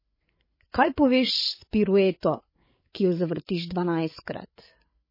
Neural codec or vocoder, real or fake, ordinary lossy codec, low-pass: codec, 16 kHz, 8 kbps, FreqCodec, larger model; fake; MP3, 24 kbps; 5.4 kHz